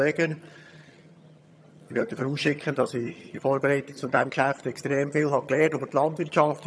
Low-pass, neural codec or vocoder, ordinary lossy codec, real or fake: none; vocoder, 22.05 kHz, 80 mel bands, HiFi-GAN; none; fake